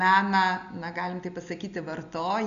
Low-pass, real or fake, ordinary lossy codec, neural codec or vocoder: 7.2 kHz; real; AAC, 96 kbps; none